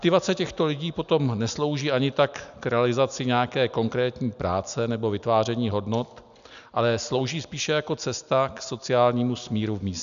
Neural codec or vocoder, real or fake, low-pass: none; real; 7.2 kHz